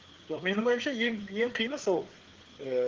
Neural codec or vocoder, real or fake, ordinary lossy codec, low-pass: codec, 16 kHz, 4 kbps, FreqCodec, larger model; fake; Opus, 16 kbps; 7.2 kHz